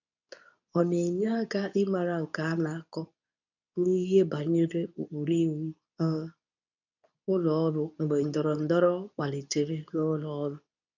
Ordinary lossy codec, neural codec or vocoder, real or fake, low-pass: none; codec, 24 kHz, 0.9 kbps, WavTokenizer, medium speech release version 2; fake; 7.2 kHz